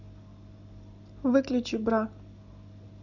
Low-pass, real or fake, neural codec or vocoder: 7.2 kHz; real; none